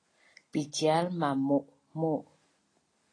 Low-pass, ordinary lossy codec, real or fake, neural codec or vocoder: 9.9 kHz; AAC, 32 kbps; real; none